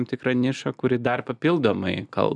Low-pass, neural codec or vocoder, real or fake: 10.8 kHz; none; real